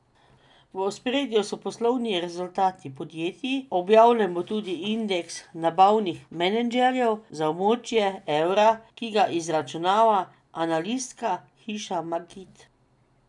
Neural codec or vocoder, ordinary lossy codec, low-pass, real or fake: none; none; 10.8 kHz; real